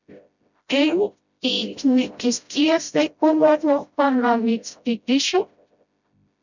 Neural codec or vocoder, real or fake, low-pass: codec, 16 kHz, 0.5 kbps, FreqCodec, smaller model; fake; 7.2 kHz